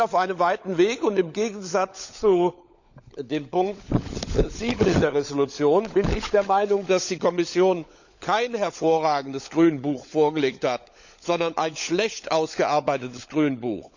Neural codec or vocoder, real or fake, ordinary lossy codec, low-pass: codec, 16 kHz, 16 kbps, FunCodec, trained on LibriTTS, 50 frames a second; fake; none; 7.2 kHz